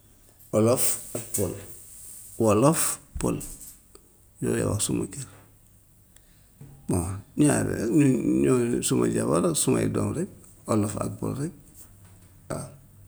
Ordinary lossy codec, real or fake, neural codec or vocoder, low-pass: none; real; none; none